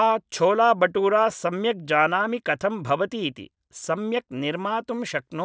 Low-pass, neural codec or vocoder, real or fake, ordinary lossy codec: none; none; real; none